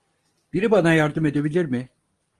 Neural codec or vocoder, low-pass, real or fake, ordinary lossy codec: none; 10.8 kHz; real; Opus, 24 kbps